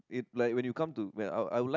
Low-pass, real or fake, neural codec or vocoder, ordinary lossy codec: 7.2 kHz; real; none; none